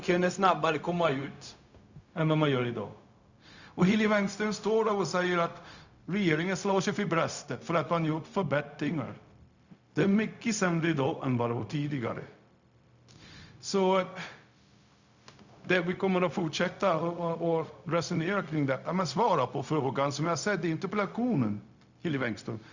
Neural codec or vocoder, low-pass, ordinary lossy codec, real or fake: codec, 16 kHz, 0.4 kbps, LongCat-Audio-Codec; 7.2 kHz; Opus, 64 kbps; fake